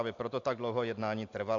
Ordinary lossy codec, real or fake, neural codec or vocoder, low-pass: AAC, 48 kbps; real; none; 7.2 kHz